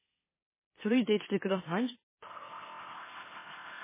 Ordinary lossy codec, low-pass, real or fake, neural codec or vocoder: MP3, 16 kbps; 3.6 kHz; fake; autoencoder, 44.1 kHz, a latent of 192 numbers a frame, MeloTTS